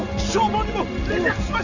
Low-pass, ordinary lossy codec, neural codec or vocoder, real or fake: 7.2 kHz; none; none; real